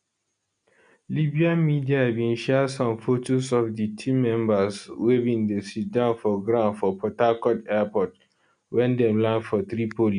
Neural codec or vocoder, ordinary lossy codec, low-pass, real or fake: none; none; 9.9 kHz; real